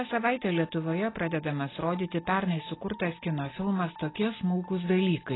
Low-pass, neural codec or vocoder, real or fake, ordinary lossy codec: 7.2 kHz; none; real; AAC, 16 kbps